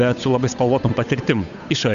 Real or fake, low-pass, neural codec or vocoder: fake; 7.2 kHz; codec, 16 kHz, 8 kbps, FunCodec, trained on Chinese and English, 25 frames a second